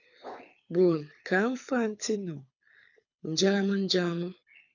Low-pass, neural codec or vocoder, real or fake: 7.2 kHz; codec, 24 kHz, 6 kbps, HILCodec; fake